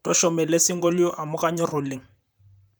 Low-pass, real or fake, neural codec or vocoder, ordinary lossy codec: none; fake; vocoder, 44.1 kHz, 128 mel bands, Pupu-Vocoder; none